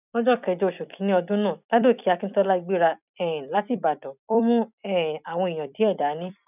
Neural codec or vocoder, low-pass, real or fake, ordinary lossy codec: vocoder, 44.1 kHz, 128 mel bands every 256 samples, BigVGAN v2; 3.6 kHz; fake; none